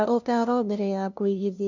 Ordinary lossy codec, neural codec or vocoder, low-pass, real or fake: none; codec, 16 kHz, 0.5 kbps, FunCodec, trained on LibriTTS, 25 frames a second; 7.2 kHz; fake